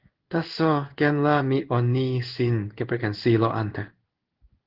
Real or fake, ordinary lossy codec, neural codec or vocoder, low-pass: fake; Opus, 16 kbps; codec, 16 kHz in and 24 kHz out, 1 kbps, XY-Tokenizer; 5.4 kHz